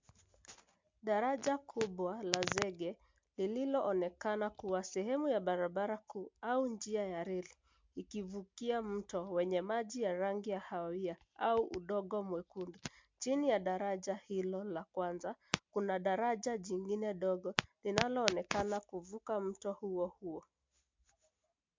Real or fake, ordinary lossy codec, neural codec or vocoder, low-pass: real; MP3, 64 kbps; none; 7.2 kHz